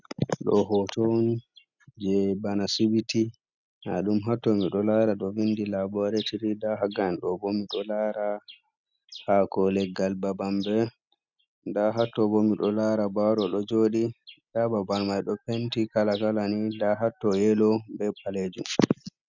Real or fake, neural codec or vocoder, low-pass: real; none; 7.2 kHz